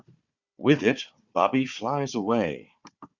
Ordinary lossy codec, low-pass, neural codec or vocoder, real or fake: Opus, 64 kbps; 7.2 kHz; codec, 16 kHz, 4 kbps, FunCodec, trained on Chinese and English, 50 frames a second; fake